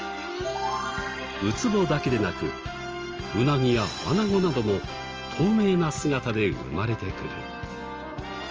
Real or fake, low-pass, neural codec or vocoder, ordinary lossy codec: real; 7.2 kHz; none; Opus, 24 kbps